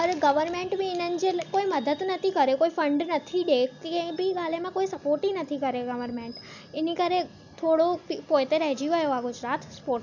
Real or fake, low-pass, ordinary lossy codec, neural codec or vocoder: real; 7.2 kHz; none; none